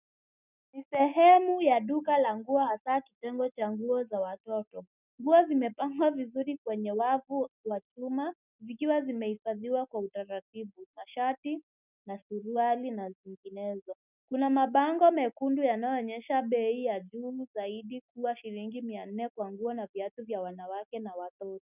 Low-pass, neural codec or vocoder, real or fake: 3.6 kHz; none; real